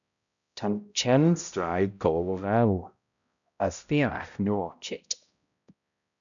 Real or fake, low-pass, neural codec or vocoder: fake; 7.2 kHz; codec, 16 kHz, 0.5 kbps, X-Codec, HuBERT features, trained on balanced general audio